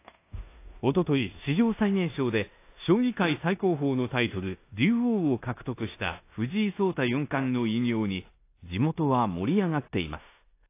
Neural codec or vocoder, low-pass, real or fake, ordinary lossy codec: codec, 16 kHz in and 24 kHz out, 0.9 kbps, LongCat-Audio-Codec, four codebook decoder; 3.6 kHz; fake; AAC, 24 kbps